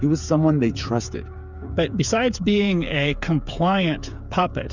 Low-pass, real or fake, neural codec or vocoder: 7.2 kHz; fake; codec, 16 kHz, 8 kbps, FreqCodec, smaller model